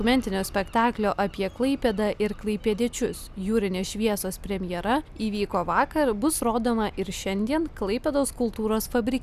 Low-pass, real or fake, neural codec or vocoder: 14.4 kHz; real; none